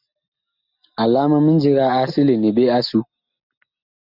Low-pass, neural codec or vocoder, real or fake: 5.4 kHz; none; real